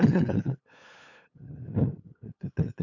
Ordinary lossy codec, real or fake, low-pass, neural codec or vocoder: none; fake; 7.2 kHz; codec, 16 kHz, 8 kbps, FunCodec, trained on LibriTTS, 25 frames a second